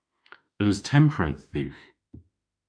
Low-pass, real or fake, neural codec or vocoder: 9.9 kHz; fake; autoencoder, 48 kHz, 32 numbers a frame, DAC-VAE, trained on Japanese speech